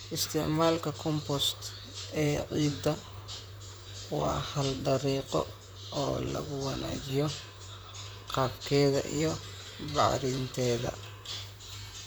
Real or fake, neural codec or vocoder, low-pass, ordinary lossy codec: fake; vocoder, 44.1 kHz, 128 mel bands, Pupu-Vocoder; none; none